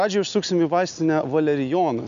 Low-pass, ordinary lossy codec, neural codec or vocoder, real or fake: 7.2 kHz; MP3, 96 kbps; none; real